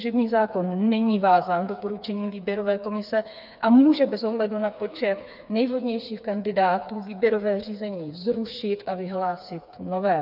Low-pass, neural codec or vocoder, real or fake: 5.4 kHz; codec, 16 kHz, 4 kbps, FreqCodec, smaller model; fake